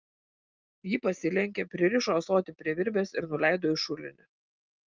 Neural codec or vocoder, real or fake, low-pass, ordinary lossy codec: none; real; 7.2 kHz; Opus, 24 kbps